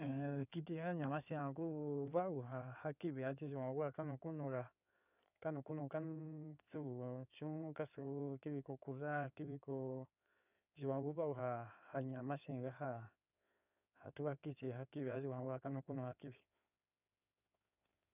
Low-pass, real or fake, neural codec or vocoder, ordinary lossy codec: 3.6 kHz; fake; codec, 16 kHz in and 24 kHz out, 2.2 kbps, FireRedTTS-2 codec; none